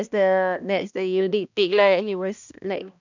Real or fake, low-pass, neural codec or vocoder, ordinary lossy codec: fake; 7.2 kHz; codec, 16 kHz, 1 kbps, X-Codec, HuBERT features, trained on balanced general audio; none